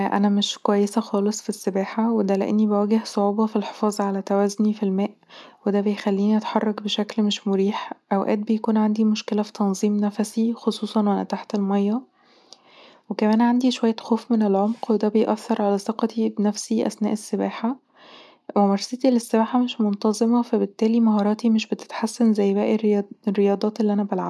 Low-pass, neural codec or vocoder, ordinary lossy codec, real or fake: none; none; none; real